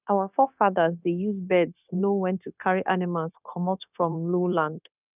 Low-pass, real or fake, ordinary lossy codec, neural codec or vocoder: 3.6 kHz; fake; none; codec, 16 kHz, 0.9 kbps, LongCat-Audio-Codec